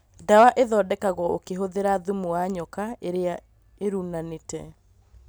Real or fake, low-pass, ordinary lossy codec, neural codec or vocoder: real; none; none; none